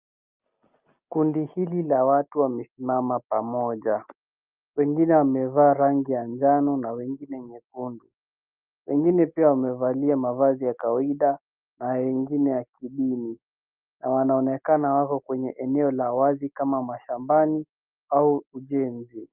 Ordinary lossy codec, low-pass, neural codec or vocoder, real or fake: Opus, 24 kbps; 3.6 kHz; none; real